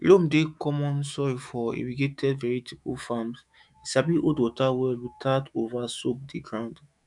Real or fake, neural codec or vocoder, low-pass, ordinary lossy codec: fake; autoencoder, 48 kHz, 128 numbers a frame, DAC-VAE, trained on Japanese speech; 10.8 kHz; none